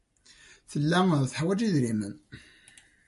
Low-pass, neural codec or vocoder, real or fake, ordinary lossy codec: 14.4 kHz; none; real; MP3, 48 kbps